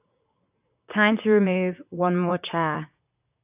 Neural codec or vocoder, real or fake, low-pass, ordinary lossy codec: vocoder, 44.1 kHz, 128 mel bands, Pupu-Vocoder; fake; 3.6 kHz; AAC, 32 kbps